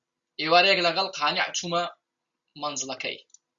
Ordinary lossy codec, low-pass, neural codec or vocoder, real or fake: Opus, 64 kbps; 7.2 kHz; none; real